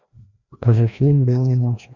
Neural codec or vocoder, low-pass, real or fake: codec, 16 kHz, 1 kbps, FreqCodec, larger model; 7.2 kHz; fake